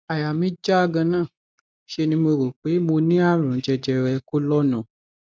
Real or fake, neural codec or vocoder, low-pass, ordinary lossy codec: real; none; none; none